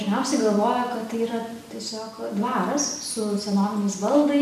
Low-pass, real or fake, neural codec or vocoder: 14.4 kHz; real; none